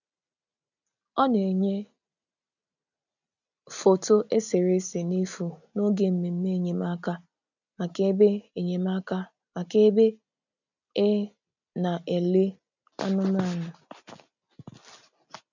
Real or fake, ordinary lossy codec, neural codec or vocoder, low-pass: real; none; none; 7.2 kHz